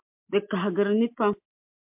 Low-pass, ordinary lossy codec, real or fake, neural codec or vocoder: 3.6 kHz; MP3, 32 kbps; real; none